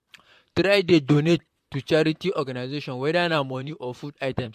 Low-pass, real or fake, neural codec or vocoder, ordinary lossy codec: 14.4 kHz; fake; vocoder, 44.1 kHz, 128 mel bands, Pupu-Vocoder; MP3, 64 kbps